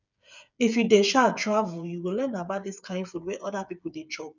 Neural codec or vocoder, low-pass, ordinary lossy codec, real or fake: codec, 16 kHz, 8 kbps, FreqCodec, smaller model; 7.2 kHz; none; fake